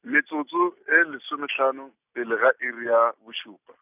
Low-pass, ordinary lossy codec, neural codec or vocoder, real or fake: 3.6 kHz; none; none; real